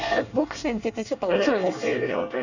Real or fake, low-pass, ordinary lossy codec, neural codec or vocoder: fake; 7.2 kHz; none; codec, 24 kHz, 1 kbps, SNAC